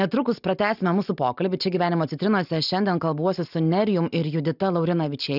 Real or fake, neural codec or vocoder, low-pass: real; none; 5.4 kHz